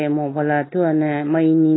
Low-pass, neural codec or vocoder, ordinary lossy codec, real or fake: 7.2 kHz; none; AAC, 16 kbps; real